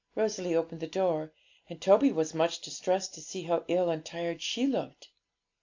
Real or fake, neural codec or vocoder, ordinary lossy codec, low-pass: real; none; AAC, 48 kbps; 7.2 kHz